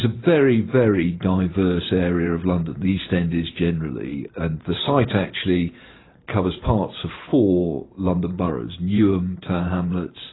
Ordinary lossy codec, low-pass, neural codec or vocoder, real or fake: AAC, 16 kbps; 7.2 kHz; vocoder, 44.1 kHz, 128 mel bands every 512 samples, BigVGAN v2; fake